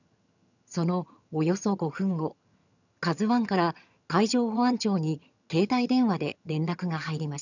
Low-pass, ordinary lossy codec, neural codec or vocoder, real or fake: 7.2 kHz; none; vocoder, 22.05 kHz, 80 mel bands, HiFi-GAN; fake